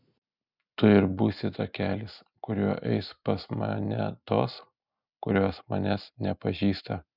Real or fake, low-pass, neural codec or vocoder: real; 5.4 kHz; none